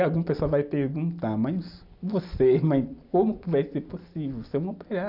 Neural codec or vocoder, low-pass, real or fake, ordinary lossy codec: none; 5.4 kHz; real; none